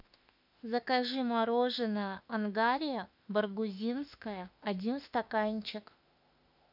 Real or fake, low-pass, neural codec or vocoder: fake; 5.4 kHz; autoencoder, 48 kHz, 32 numbers a frame, DAC-VAE, trained on Japanese speech